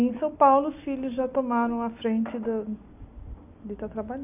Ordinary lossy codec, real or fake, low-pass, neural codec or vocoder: none; real; 3.6 kHz; none